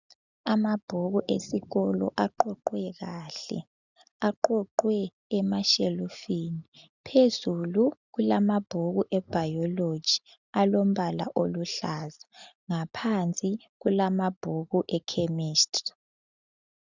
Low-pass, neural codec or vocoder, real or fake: 7.2 kHz; none; real